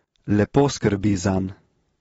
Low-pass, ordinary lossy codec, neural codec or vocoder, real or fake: 19.8 kHz; AAC, 24 kbps; none; real